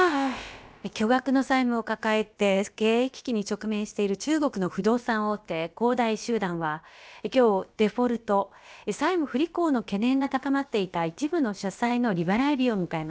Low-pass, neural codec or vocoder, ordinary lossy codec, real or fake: none; codec, 16 kHz, about 1 kbps, DyCAST, with the encoder's durations; none; fake